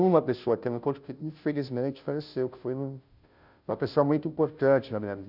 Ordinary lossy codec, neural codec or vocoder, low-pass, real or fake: none; codec, 16 kHz, 0.5 kbps, FunCodec, trained on Chinese and English, 25 frames a second; 5.4 kHz; fake